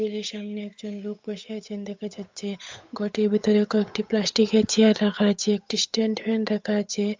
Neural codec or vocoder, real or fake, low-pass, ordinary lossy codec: codec, 16 kHz, 8 kbps, FunCodec, trained on Chinese and English, 25 frames a second; fake; 7.2 kHz; MP3, 64 kbps